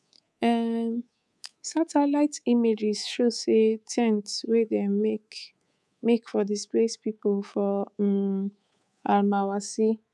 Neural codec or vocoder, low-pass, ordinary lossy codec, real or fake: codec, 24 kHz, 3.1 kbps, DualCodec; none; none; fake